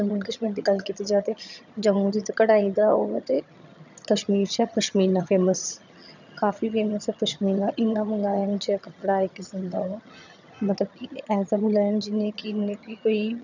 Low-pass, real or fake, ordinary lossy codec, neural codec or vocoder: 7.2 kHz; fake; none; vocoder, 22.05 kHz, 80 mel bands, HiFi-GAN